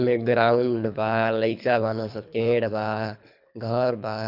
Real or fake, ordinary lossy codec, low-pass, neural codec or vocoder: fake; none; 5.4 kHz; codec, 24 kHz, 3 kbps, HILCodec